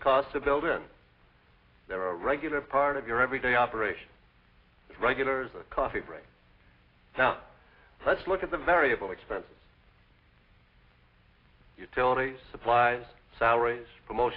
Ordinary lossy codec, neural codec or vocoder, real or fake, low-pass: AAC, 24 kbps; none; real; 5.4 kHz